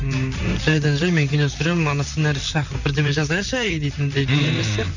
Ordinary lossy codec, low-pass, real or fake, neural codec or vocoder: none; 7.2 kHz; fake; vocoder, 44.1 kHz, 128 mel bands, Pupu-Vocoder